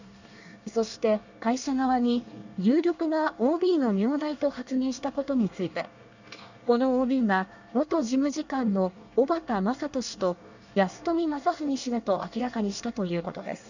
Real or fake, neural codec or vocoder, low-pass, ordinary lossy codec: fake; codec, 24 kHz, 1 kbps, SNAC; 7.2 kHz; none